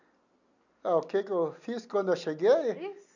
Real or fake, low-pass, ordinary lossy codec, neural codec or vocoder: real; 7.2 kHz; none; none